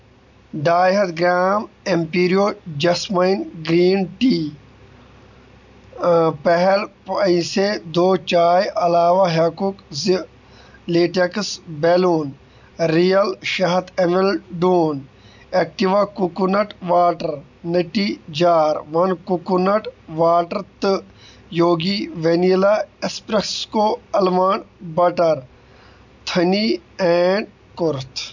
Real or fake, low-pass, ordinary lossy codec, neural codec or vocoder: real; 7.2 kHz; none; none